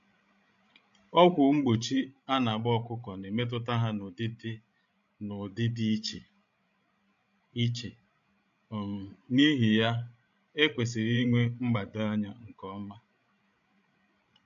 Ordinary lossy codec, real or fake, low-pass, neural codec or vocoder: AAC, 64 kbps; fake; 7.2 kHz; codec, 16 kHz, 16 kbps, FreqCodec, larger model